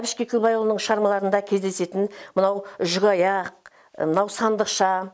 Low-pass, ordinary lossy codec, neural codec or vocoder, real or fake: none; none; none; real